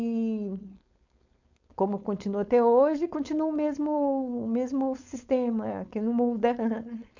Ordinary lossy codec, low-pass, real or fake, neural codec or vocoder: none; 7.2 kHz; fake; codec, 16 kHz, 4.8 kbps, FACodec